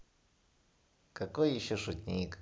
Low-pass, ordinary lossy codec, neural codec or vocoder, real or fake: none; none; none; real